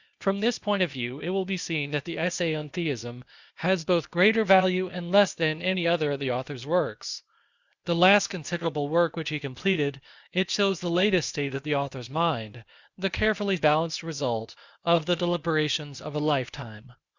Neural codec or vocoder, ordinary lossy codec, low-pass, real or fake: codec, 16 kHz, 0.8 kbps, ZipCodec; Opus, 64 kbps; 7.2 kHz; fake